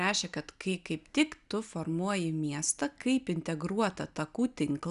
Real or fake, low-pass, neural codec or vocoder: real; 10.8 kHz; none